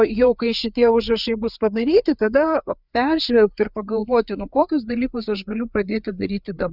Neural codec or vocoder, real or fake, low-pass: codec, 16 kHz, 4 kbps, FreqCodec, larger model; fake; 5.4 kHz